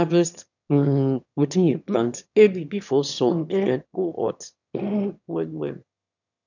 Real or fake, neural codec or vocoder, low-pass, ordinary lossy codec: fake; autoencoder, 22.05 kHz, a latent of 192 numbers a frame, VITS, trained on one speaker; 7.2 kHz; none